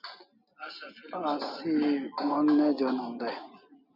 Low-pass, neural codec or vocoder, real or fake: 5.4 kHz; none; real